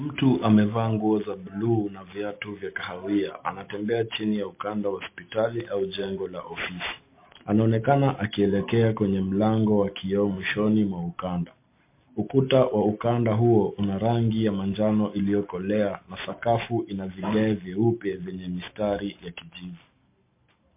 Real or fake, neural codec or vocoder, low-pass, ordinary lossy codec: real; none; 3.6 kHz; MP3, 24 kbps